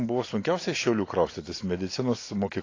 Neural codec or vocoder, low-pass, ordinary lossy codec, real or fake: none; 7.2 kHz; AAC, 32 kbps; real